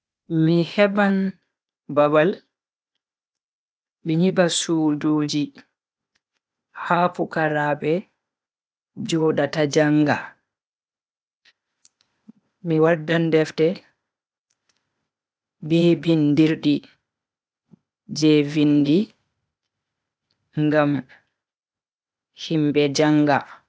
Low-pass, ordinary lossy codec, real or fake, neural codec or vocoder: none; none; fake; codec, 16 kHz, 0.8 kbps, ZipCodec